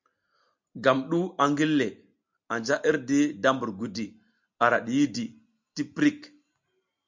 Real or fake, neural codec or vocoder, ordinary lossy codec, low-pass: real; none; MP3, 64 kbps; 7.2 kHz